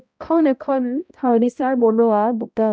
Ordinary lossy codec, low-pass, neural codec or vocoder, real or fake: none; none; codec, 16 kHz, 0.5 kbps, X-Codec, HuBERT features, trained on balanced general audio; fake